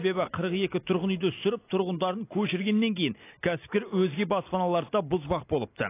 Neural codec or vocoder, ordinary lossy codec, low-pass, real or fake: none; AAC, 24 kbps; 3.6 kHz; real